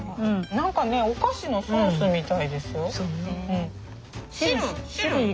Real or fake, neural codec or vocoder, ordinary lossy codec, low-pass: real; none; none; none